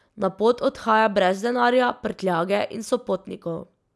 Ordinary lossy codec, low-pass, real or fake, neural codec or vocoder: none; none; real; none